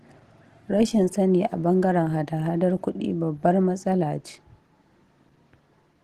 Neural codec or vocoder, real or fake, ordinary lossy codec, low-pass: none; real; Opus, 16 kbps; 14.4 kHz